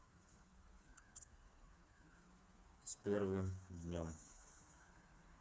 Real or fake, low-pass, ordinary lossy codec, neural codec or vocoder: fake; none; none; codec, 16 kHz, 4 kbps, FreqCodec, smaller model